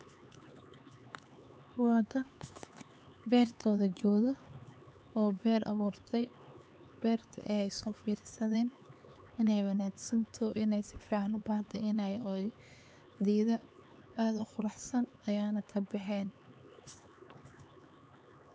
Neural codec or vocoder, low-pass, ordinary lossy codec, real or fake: codec, 16 kHz, 4 kbps, X-Codec, HuBERT features, trained on LibriSpeech; none; none; fake